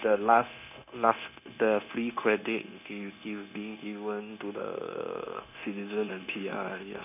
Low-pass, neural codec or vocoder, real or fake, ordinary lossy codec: 3.6 kHz; codec, 16 kHz, 0.9 kbps, LongCat-Audio-Codec; fake; none